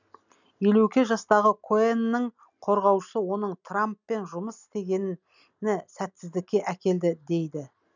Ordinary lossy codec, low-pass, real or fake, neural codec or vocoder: none; 7.2 kHz; real; none